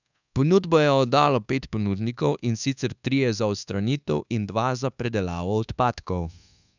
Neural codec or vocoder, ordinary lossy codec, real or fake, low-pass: codec, 24 kHz, 1.2 kbps, DualCodec; none; fake; 7.2 kHz